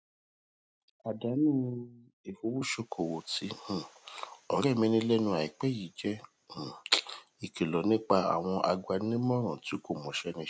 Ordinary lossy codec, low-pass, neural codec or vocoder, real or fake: none; none; none; real